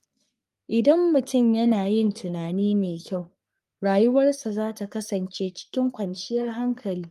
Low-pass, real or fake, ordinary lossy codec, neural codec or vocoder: 14.4 kHz; fake; Opus, 32 kbps; codec, 44.1 kHz, 3.4 kbps, Pupu-Codec